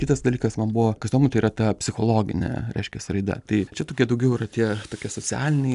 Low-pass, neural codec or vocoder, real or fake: 10.8 kHz; none; real